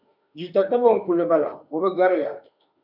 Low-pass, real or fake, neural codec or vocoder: 5.4 kHz; fake; autoencoder, 48 kHz, 32 numbers a frame, DAC-VAE, trained on Japanese speech